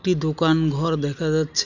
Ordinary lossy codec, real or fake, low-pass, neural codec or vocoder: none; real; 7.2 kHz; none